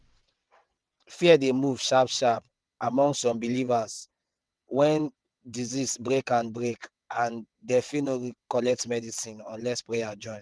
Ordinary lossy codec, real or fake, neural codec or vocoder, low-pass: none; fake; vocoder, 22.05 kHz, 80 mel bands, WaveNeXt; none